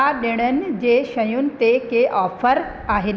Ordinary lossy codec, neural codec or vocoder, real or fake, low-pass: none; none; real; none